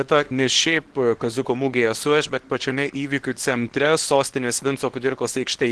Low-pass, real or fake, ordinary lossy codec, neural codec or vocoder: 10.8 kHz; fake; Opus, 16 kbps; codec, 24 kHz, 0.9 kbps, WavTokenizer, small release